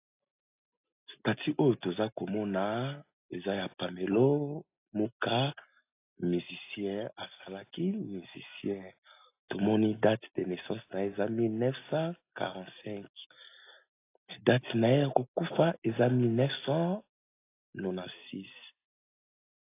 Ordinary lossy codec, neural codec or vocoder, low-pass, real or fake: AAC, 24 kbps; none; 3.6 kHz; real